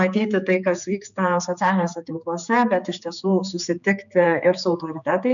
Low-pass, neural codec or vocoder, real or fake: 7.2 kHz; codec, 16 kHz, 4 kbps, X-Codec, HuBERT features, trained on general audio; fake